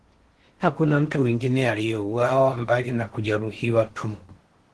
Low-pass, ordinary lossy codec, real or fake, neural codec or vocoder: 10.8 kHz; Opus, 16 kbps; fake; codec, 16 kHz in and 24 kHz out, 0.8 kbps, FocalCodec, streaming, 65536 codes